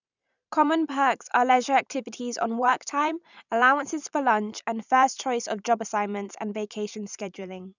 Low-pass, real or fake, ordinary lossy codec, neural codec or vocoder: 7.2 kHz; fake; none; vocoder, 44.1 kHz, 128 mel bands every 512 samples, BigVGAN v2